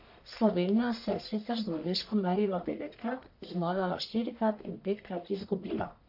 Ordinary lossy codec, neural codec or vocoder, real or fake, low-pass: AAC, 48 kbps; codec, 44.1 kHz, 1.7 kbps, Pupu-Codec; fake; 5.4 kHz